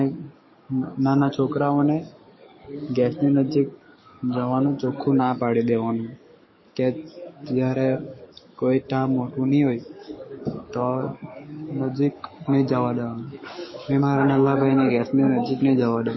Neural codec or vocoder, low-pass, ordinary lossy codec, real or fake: codec, 44.1 kHz, 7.8 kbps, DAC; 7.2 kHz; MP3, 24 kbps; fake